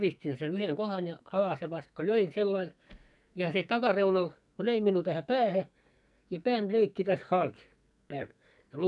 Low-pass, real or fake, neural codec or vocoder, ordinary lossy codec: 10.8 kHz; fake; codec, 44.1 kHz, 2.6 kbps, SNAC; none